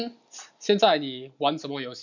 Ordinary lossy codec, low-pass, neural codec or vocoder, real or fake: none; 7.2 kHz; none; real